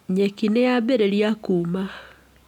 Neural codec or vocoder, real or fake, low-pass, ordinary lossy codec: none; real; 19.8 kHz; none